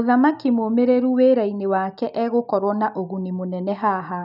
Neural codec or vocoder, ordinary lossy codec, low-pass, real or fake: none; none; 5.4 kHz; real